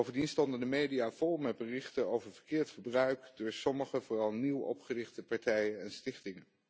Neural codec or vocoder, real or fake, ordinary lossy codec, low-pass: none; real; none; none